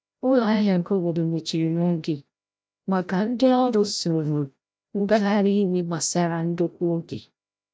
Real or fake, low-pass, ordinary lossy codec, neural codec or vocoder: fake; none; none; codec, 16 kHz, 0.5 kbps, FreqCodec, larger model